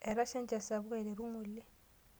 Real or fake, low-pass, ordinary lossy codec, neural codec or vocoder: real; none; none; none